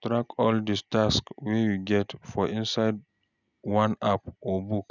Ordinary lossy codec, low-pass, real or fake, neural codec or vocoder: none; 7.2 kHz; real; none